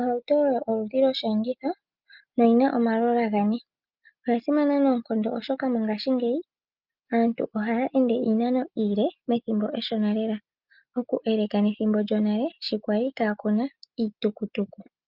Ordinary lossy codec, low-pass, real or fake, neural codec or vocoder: Opus, 24 kbps; 5.4 kHz; real; none